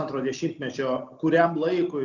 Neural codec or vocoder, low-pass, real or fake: none; 7.2 kHz; real